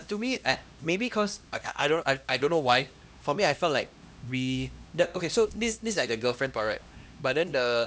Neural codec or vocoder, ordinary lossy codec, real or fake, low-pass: codec, 16 kHz, 1 kbps, X-Codec, HuBERT features, trained on LibriSpeech; none; fake; none